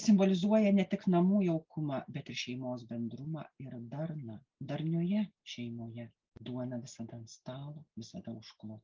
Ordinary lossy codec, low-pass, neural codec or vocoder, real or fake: Opus, 24 kbps; 7.2 kHz; none; real